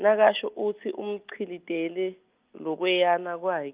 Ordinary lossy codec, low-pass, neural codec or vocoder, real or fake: Opus, 32 kbps; 3.6 kHz; none; real